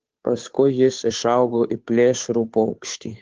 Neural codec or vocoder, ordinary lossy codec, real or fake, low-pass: codec, 16 kHz, 2 kbps, FunCodec, trained on Chinese and English, 25 frames a second; Opus, 16 kbps; fake; 7.2 kHz